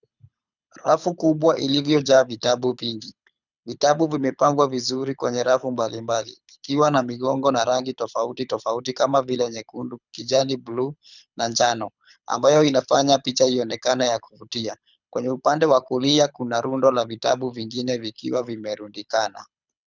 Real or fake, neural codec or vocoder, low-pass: fake; codec, 24 kHz, 6 kbps, HILCodec; 7.2 kHz